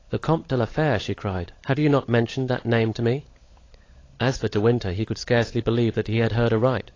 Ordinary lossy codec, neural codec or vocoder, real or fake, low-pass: AAC, 32 kbps; codec, 16 kHz, 16 kbps, FunCodec, trained on LibriTTS, 50 frames a second; fake; 7.2 kHz